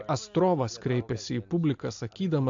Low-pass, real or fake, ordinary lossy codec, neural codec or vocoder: 7.2 kHz; real; AAC, 64 kbps; none